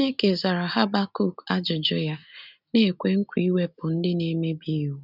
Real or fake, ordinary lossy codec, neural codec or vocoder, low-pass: real; none; none; 5.4 kHz